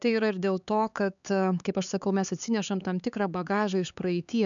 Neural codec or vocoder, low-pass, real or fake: codec, 16 kHz, 4 kbps, X-Codec, HuBERT features, trained on LibriSpeech; 7.2 kHz; fake